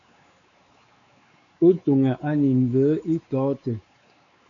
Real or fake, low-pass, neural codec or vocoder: fake; 7.2 kHz; codec, 16 kHz, 4 kbps, X-Codec, WavLM features, trained on Multilingual LibriSpeech